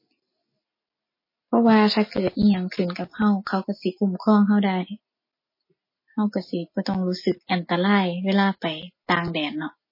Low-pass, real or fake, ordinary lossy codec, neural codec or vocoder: 5.4 kHz; real; MP3, 24 kbps; none